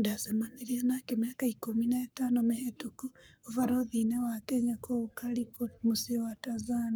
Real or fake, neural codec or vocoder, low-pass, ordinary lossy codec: fake; codec, 44.1 kHz, 7.8 kbps, Pupu-Codec; none; none